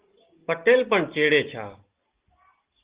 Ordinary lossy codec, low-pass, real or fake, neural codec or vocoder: Opus, 16 kbps; 3.6 kHz; real; none